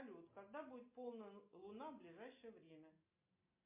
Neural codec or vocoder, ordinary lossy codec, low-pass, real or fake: none; MP3, 24 kbps; 3.6 kHz; real